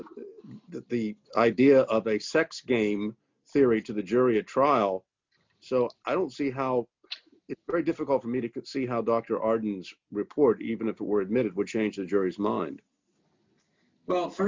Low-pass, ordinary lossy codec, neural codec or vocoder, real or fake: 7.2 kHz; MP3, 64 kbps; none; real